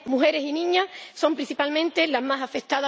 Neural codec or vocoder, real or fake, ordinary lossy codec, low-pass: none; real; none; none